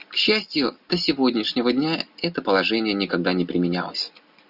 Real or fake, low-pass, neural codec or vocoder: real; 5.4 kHz; none